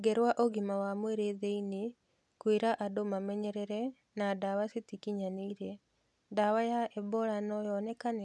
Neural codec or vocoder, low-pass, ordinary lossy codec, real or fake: none; none; none; real